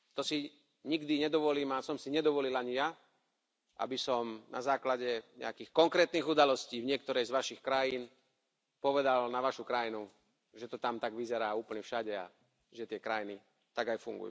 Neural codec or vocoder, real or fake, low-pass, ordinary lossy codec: none; real; none; none